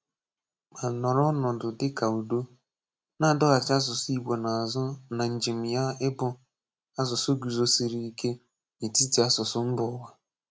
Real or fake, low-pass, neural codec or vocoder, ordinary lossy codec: real; none; none; none